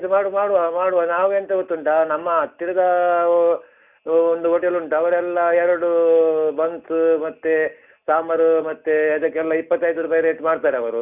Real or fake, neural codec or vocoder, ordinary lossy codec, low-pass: real; none; none; 3.6 kHz